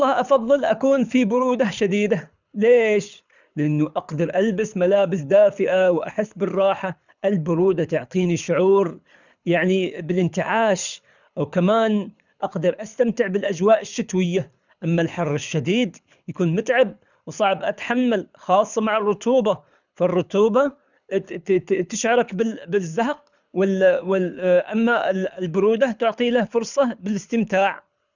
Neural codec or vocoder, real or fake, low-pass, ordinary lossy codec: codec, 24 kHz, 6 kbps, HILCodec; fake; 7.2 kHz; none